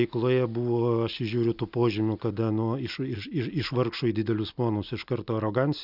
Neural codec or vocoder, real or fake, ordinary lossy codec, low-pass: none; real; Opus, 64 kbps; 5.4 kHz